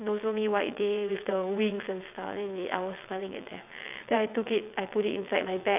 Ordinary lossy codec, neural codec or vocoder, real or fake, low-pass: none; vocoder, 22.05 kHz, 80 mel bands, WaveNeXt; fake; 3.6 kHz